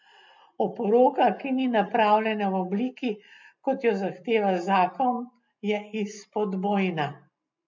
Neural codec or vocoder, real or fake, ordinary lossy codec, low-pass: none; real; MP3, 48 kbps; 7.2 kHz